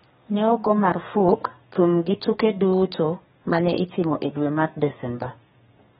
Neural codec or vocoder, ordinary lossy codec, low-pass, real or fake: codec, 32 kHz, 1.9 kbps, SNAC; AAC, 16 kbps; 14.4 kHz; fake